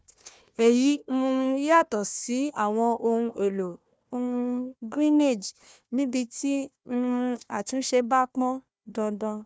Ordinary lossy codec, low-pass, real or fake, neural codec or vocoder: none; none; fake; codec, 16 kHz, 1 kbps, FunCodec, trained on Chinese and English, 50 frames a second